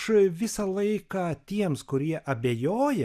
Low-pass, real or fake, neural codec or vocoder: 14.4 kHz; real; none